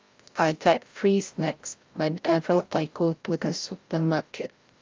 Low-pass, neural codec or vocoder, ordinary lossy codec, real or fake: 7.2 kHz; codec, 16 kHz, 0.5 kbps, FreqCodec, larger model; Opus, 32 kbps; fake